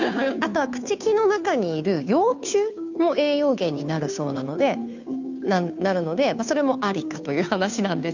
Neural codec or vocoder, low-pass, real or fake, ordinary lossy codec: codec, 16 kHz, 2 kbps, FunCodec, trained on Chinese and English, 25 frames a second; 7.2 kHz; fake; none